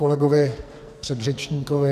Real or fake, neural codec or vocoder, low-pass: fake; codec, 44.1 kHz, 2.6 kbps, SNAC; 14.4 kHz